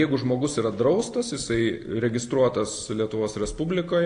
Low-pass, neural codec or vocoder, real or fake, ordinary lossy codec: 9.9 kHz; none; real; AAC, 48 kbps